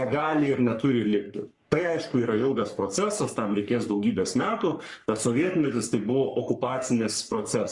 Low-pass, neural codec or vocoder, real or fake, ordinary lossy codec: 10.8 kHz; codec, 44.1 kHz, 3.4 kbps, Pupu-Codec; fake; Opus, 64 kbps